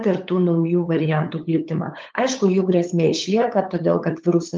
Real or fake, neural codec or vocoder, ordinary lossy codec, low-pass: fake; codec, 16 kHz, 8 kbps, FunCodec, trained on LibriTTS, 25 frames a second; Opus, 32 kbps; 7.2 kHz